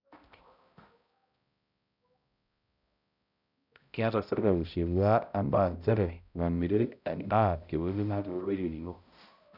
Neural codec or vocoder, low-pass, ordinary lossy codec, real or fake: codec, 16 kHz, 0.5 kbps, X-Codec, HuBERT features, trained on balanced general audio; 5.4 kHz; none; fake